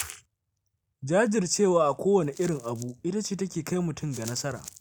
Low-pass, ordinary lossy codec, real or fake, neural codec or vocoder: none; none; real; none